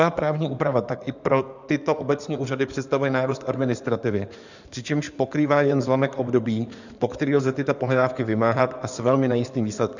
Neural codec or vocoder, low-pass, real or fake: codec, 16 kHz in and 24 kHz out, 2.2 kbps, FireRedTTS-2 codec; 7.2 kHz; fake